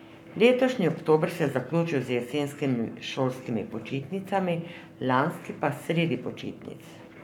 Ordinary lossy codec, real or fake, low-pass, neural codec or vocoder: none; fake; 19.8 kHz; codec, 44.1 kHz, 7.8 kbps, Pupu-Codec